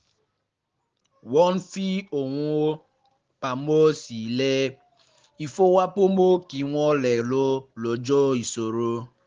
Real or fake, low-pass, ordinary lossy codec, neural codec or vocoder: real; 7.2 kHz; Opus, 16 kbps; none